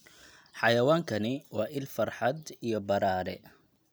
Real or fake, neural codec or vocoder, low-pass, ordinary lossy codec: real; none; none; none